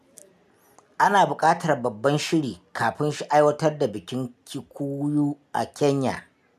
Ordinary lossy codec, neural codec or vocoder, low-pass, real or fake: none; none; 14.4 kHz; real